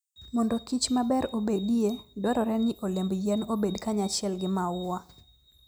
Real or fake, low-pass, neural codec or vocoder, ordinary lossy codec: real; none; none; none